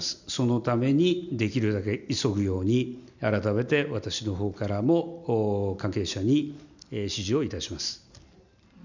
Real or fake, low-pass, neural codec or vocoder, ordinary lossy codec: real; 7.2 kHz; none; none